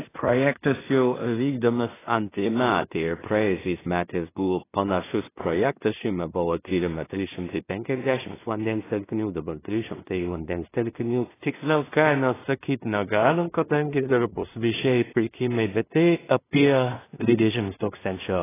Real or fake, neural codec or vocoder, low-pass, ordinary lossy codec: fake; codec, 16 kHz in and 24 kHz out, 0.4 kbps, LongCat-Audio-Codec, two codebook decoder; 3.6 kHz; AAC, 16 kbps